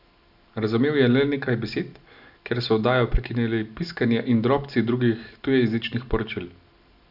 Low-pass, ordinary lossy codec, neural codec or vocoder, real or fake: 5.4 kHz; none; none; real